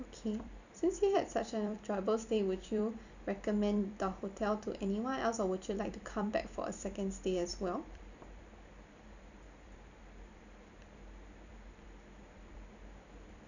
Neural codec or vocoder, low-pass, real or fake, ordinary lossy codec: vocoder, 44.1 kHz, 128 mel bands every 256 samples, BigVGAN v2; 7.2 kHz; fake; none